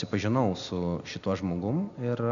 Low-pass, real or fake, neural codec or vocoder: 7.2 kHz; real; none